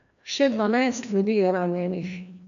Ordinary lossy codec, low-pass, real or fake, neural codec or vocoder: AAC, 96 kbps; 7.2 kHz; fake; codec, 16 kHz, 1 kbps, FreqCodec, larger model